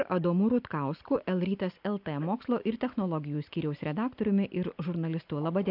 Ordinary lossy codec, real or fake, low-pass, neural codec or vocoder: AAC, 48 kbps; real; 5.4 kHz; none